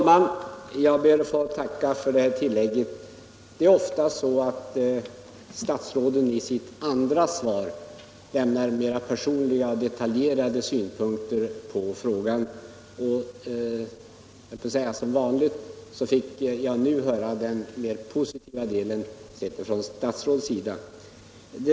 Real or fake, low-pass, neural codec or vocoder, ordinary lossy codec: real; none; none; none